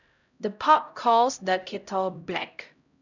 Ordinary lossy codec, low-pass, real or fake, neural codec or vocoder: none; 7.2 kHz; fake; codec, 16 kHz, 0.5 kbps, X-Codec, HuBERT features, trained on LibriSpeech